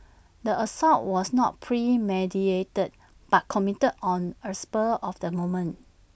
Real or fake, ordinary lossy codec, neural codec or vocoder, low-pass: real; none; none; none